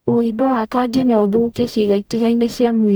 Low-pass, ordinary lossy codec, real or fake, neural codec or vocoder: none; none; fake; codec, 44.1 kHz, 0.9 kbps, DAC